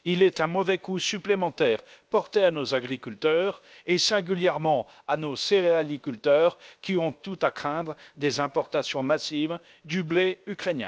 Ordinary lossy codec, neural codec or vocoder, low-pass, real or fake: none; codec, 16 kHz, about 1 kbps, DyCAST, with the encoder's durations; none; fake